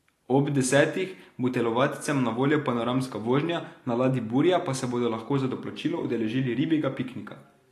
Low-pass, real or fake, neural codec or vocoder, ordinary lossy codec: 14.4 kHz; real; none; AAC, 48 kbps